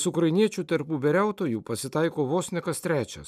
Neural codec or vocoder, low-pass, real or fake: none; 14.4 kHz; real